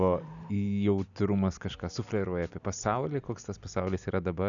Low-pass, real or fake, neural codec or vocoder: 7.2 kHz; real; none